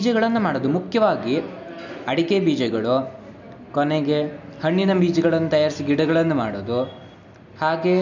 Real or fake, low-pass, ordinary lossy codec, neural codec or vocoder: real; 7.2 kHz; none; none